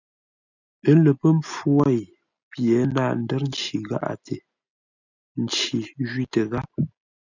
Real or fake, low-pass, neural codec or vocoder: real; 7.2 kHz; none